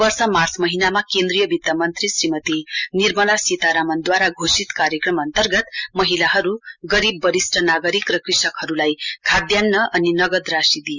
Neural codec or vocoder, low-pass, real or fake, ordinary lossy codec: none; 7.2 kHz; real; Opus, 64 kbps